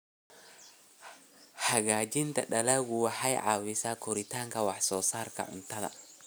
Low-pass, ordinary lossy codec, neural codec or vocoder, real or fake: none; none; none; real